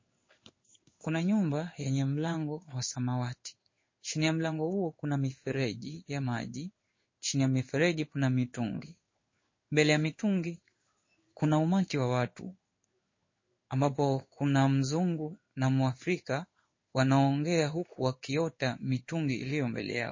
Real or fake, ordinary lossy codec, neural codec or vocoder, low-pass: fake; MP3, 32 kbps; codec, 16 kHz in and 24 kHz out, 1 kbps, XY-Tokenizer; 7.2 kHz